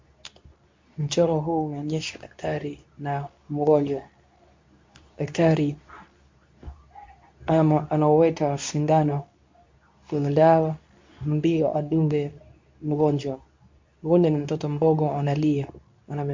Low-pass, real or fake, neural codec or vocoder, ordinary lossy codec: 7.2 kHz; fake; codec, 24 kHz, 0.9 kbps, WavTokenizer, medium speech release version 2; MP3, 48 kbps